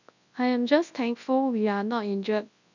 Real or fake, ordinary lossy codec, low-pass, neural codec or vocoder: fake; none; 7.2 kHz; codec, 24 kHz, 0.9 kbps, WavTokenizer, large speech release